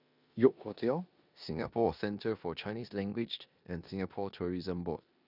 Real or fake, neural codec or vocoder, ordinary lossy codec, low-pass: fake; codec, 16 kHz in and 24 kHz out, 0.9 kbps, LongCat-Audio-Codec, four codebook decoder; none; 5.4 kHz